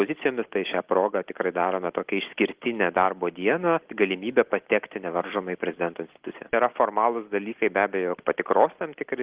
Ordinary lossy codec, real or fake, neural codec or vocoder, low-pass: Opus, 16 kbps; real; none; 3.6 kHz